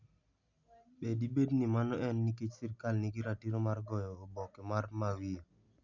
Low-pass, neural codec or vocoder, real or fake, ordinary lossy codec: 7.2 kHz; none; real; none